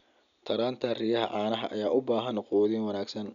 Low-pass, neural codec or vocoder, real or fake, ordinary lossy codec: 7.2 kHz; none; real; none